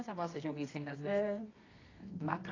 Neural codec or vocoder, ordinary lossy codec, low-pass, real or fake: codec, 24 kHz, 0.9 kbps, WavTokenizer, medium music audio release; AAC, 32 kbps; 7.2 kHz; fake